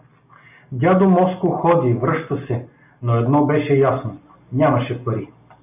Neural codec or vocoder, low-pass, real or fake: none; 3.6 kHz; real